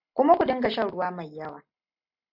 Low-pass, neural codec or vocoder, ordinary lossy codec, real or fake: 5.4 kHz; none; AAC, 48 kbps; real